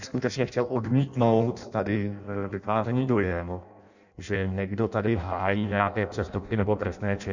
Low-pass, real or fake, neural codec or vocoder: 7.2 kHz; fake; codec, 16 kHz in and 24 kHz out, 0.6 kbps, FireRedTTS-2 codec